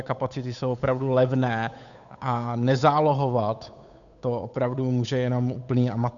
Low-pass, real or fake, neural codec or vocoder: 7.2 kHz; fake; codec, 16 kHz, 8 kbps, FunCodec, trained on Chinese and English, 25 frames a second